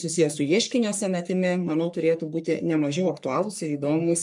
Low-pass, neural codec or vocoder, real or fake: 10.8 kHz; codec, 44.1 kHz, 3.4 kbps, Pupu-Codec; fake